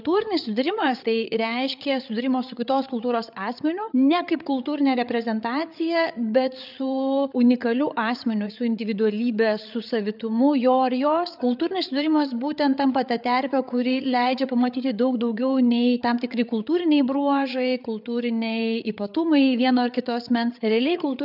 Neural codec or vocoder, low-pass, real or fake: codec, 16 kHz, 8 kbps, FreqCodec, larger model; 5.4 kHz; fake